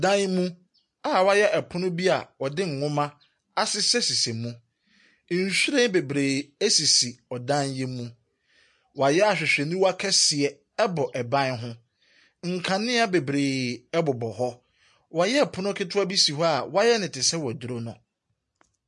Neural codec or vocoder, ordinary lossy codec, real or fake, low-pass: none; MP3, 48 kbps; real; 10.8 kHz